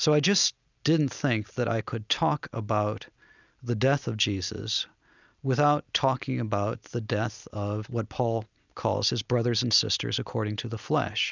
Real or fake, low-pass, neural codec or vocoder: real; 7.2 kHz; none